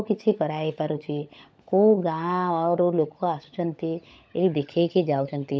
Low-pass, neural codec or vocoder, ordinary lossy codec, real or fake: none; codec, 16 kHz, 16 kbps, FunCodec, trained on LibriTTS, 50 frames a second; none; fake